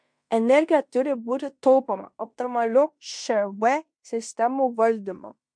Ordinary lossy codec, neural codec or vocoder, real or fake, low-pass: MP3, 64 kbps; codec, 16 kHz in and 24 kHz out, 0.9 kbps, LongCat-Audio-Codec, fine tuned four codebook decoder; fake; 9.9 kHz